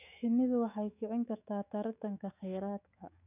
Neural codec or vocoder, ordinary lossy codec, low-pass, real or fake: autoencoder, 48 kHz, 128 numbers a frame, DAC-VAE, trained on Japanese speech; none; 3.6 kHz; fake